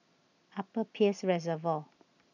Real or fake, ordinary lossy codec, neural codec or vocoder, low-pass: real; none; none; 7.2 kHz